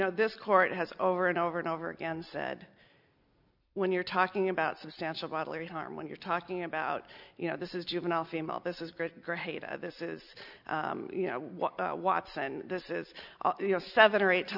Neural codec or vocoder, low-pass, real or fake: none; 5.4 kHz; real